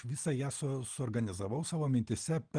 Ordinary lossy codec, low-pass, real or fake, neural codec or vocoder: Opus, 24 kbps; 9.9 kHz; real; none